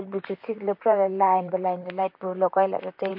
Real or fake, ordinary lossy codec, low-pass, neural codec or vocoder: fake; MP3, 32 kbps; 5.4 kHz; vocoder, 44.1 kHz, 128 mel bands, Pupu-Vocoder